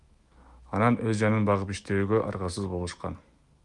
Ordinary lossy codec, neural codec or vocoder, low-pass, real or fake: Opus, 32 kbps; autoencoder, 48 kHz, 128 numbers a frame, DAC-VAE, trained on Japanese speech; 10.8 kHz; fake